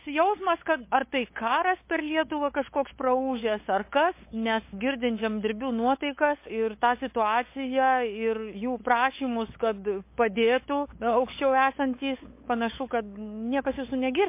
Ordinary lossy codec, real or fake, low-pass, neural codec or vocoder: MP3, 24 kbps; fake; 3.6 kHz; codec, 16 kHz, 8 kbps, FunCodec, trained on LibriTTS, 25 frames a second